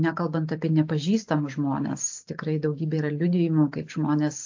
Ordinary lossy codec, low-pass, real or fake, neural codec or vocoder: AAC, 48 kbps; 7.2 kHz; real; none